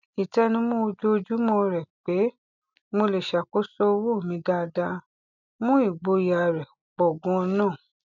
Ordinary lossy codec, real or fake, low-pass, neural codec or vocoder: none; real; 7.2 kHz; none